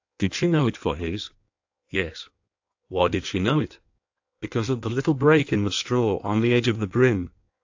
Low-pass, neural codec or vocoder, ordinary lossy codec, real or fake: 7.2 kHz; codec, 16 kHz in and 24 kHz out, 1.1 kbps, FireRedTTS-2 codec; AAC, 48 kbps; fake